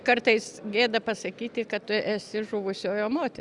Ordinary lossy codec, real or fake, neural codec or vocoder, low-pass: Opus, 64 kbps; real; none; 10.8 kHz